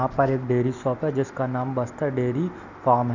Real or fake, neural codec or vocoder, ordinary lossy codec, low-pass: real; none; none; 7.2 kHz